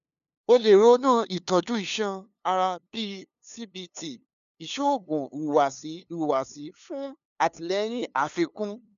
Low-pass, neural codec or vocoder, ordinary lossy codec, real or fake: 7.2 kHz; codec, 16 kHz, 2 kbps, FunCodec, trained on LibriTTS, 25 frames a second; none; fake